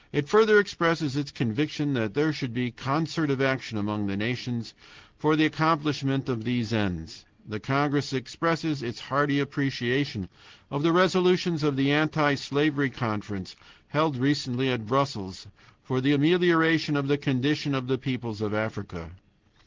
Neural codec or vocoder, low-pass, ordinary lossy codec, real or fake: none; 7.2 kHz; Opus, 16 kbps; real